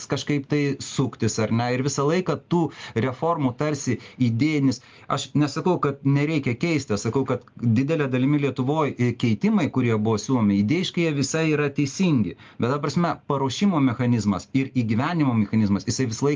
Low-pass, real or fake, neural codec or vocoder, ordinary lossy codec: 7.2 kHz; real; none; Opus, 32 kbps